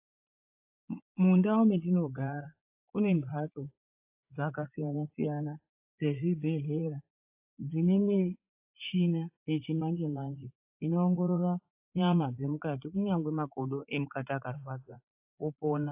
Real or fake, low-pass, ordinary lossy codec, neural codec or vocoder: fake; 3.6 kHz; AAC, 32 kbps; vocoder, 44.1 kHz, 80 mel bands, Vocos